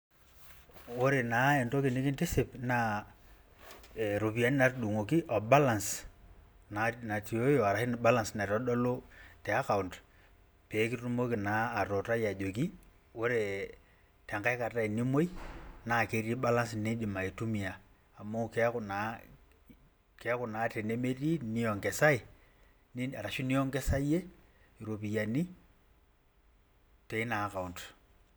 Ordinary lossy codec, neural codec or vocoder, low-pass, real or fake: none; none; none; real